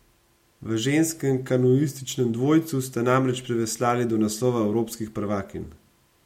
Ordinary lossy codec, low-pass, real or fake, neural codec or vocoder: MP3, 64 kbps; 19.8 kHz; real; none